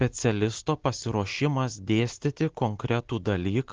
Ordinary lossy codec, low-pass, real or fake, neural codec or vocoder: Opus, 16 kbps; 7.2 kHz; real; none